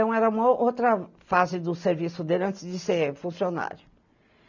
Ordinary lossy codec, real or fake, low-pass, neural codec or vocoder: none; real; 7.2 kHz; none